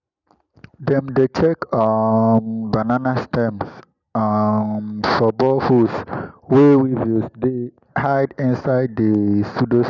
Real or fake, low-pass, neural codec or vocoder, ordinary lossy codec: real; 7.2 kHz; none; none